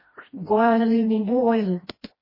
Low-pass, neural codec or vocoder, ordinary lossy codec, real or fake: 5.4 kHz; codec, 16 kHz, 1 kbps, FreqCodec, smaller model; MP3, 24 kbps; fake